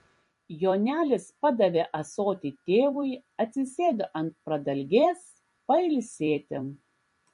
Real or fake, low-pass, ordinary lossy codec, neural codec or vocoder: fake; 14.4 kHz; MP3, 48 kbps; vocoder, 44.1 kHz, 128 mel bands every 256 samples, BigVGAN v2